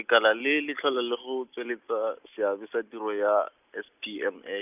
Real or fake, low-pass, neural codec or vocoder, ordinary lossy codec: real; 3.6 kHz; none; none